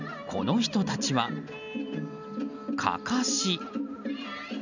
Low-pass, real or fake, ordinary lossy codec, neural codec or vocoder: 7.2 kHz; real; none; none